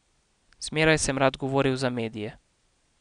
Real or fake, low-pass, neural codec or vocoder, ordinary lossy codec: real; 9.9 kHz; none; none